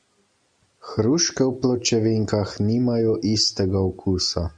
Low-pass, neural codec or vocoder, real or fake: 9.9 kHz; none; real